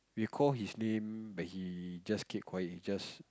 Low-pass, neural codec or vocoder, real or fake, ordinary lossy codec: none; none; real; none